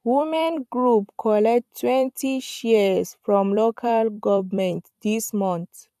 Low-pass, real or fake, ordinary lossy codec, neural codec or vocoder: 14.4 kHz; fake; AAC, 96 kbps; vocoder, 44.1 kHz, 128 mel bands every 256 samples, BigVGAN v2